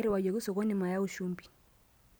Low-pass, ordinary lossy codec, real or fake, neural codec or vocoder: none; none; real; none